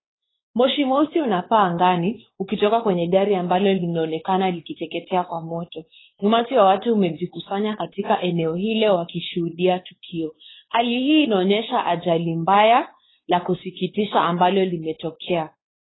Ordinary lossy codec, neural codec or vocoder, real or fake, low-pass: AAC, 16 kbps; codec, 16 kHz, 4 kbps, X-Codec, WavLM features, trained on Multilingual LibriSpeech; fake; 7.2 kHz